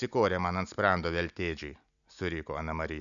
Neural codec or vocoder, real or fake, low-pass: none; real; 7.2 kHz